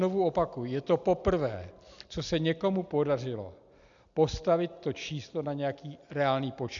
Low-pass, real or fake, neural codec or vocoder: 7.2 kHz; real; none